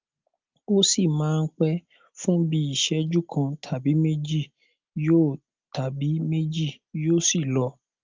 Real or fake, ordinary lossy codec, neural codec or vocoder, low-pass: real; Opus, 32 kbps; none; 7.2 kHz